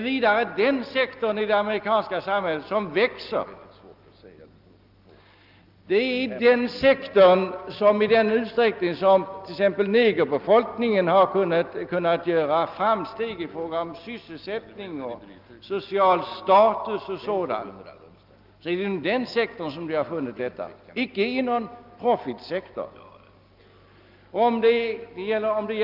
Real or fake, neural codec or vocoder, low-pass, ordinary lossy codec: real; none; 5.4 kHz; Opus, 24 kbps